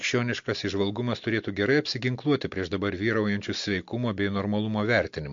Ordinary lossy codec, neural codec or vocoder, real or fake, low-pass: MP3, 48 kbps; none; real; 7.2 kHz